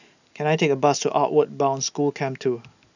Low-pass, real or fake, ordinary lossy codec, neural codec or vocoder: 7.2 kHz; real; none; none